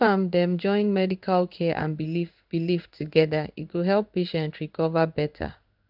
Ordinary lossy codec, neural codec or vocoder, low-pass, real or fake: none; codec, 16 kHz in and 24 kHz out, 1 kbps, XY-Tokenizer; 5.4 kHz; fake